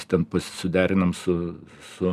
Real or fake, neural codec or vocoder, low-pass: real; none; 14.4 kHz